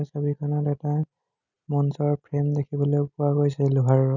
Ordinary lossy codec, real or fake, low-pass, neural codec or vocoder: none; real; 7.2 kHz; none